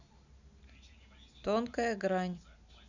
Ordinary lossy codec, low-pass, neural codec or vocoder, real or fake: none; 7.2 kHz; none; real